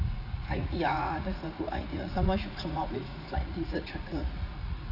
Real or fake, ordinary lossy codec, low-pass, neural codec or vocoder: fake; none; 5.4 kHz; vocoder, 44.1 kHz, 80 mel bands, Vocos